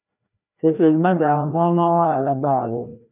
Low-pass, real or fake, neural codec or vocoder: 3.6 kHz; fake; codec, 16 kHz, 1 kbps, FreqCodec, larger model